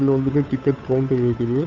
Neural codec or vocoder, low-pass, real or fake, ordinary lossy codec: codec, 16 kHz, 8 kbps, FunCodec, trained on Chinese and English, 25 frames a second; 7.2 kHz; fake; Opus, 64 kbps